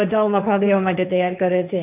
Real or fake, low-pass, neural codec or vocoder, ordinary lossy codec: fake; 3.6 kHz; codec, 16 kHz, 1.1 kbps, Voila-Tokenizer; none